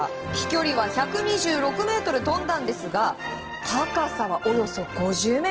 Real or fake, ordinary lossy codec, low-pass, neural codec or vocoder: real; Opus, 16 kbps; 7.2 kHz; none